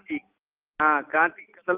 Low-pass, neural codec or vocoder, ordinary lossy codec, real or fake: 3.6 kHz; none; Opus, 24 kbps; real